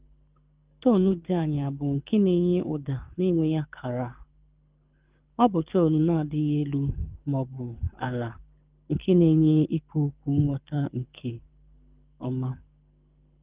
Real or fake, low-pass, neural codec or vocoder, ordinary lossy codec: fake; 3.6 kHz; codec, 24 kHz, 6 kbps, HILCodec; Opus, 24 kbps